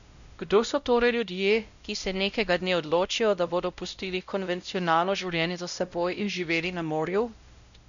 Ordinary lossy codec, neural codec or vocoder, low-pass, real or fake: none; codec, 16 kHz, 0.5 kbps, X-Codec, WavLM features, trained on Multilingual LibriSpeech; 7.2 kHz; fake